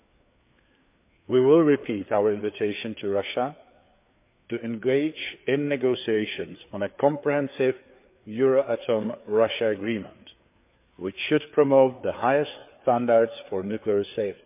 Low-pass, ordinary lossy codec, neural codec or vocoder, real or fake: 3.6 kHz; MP3, 32 kbps; codec, 16 kHz, 4 kbps, FreqCodec, larger model; fake